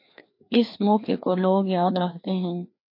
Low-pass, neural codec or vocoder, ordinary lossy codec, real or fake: 5.4 kHz; codec, 16 kHz, 2 kbps, FreqCodec, larger model; MP3, 32 kbps; fake